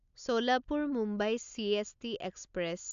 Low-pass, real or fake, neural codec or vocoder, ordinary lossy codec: 7.2 kHz; real; none; AAC, 96 kbps